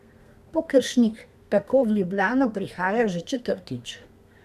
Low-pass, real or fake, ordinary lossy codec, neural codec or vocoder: 14.4 kHz; fake; none; codec, 32 kHz, 1.9 kbps, SNAC